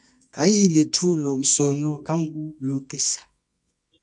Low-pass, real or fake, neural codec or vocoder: 10.8 kHz; fake; codec, 24 kHz, 0.9 kbps, WavTokenizer, medium music audio release